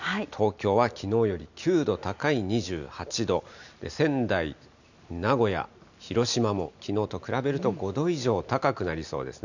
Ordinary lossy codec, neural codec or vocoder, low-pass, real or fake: none; none; 7.2 kHz; real